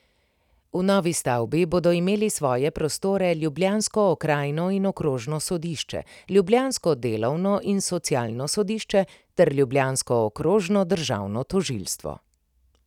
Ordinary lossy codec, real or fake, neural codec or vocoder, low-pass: none; real; none; 19.8 kHz